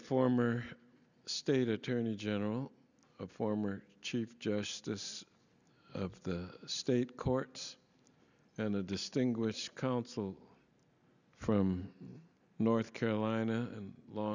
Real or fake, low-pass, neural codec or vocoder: real; 7.2 kHz; none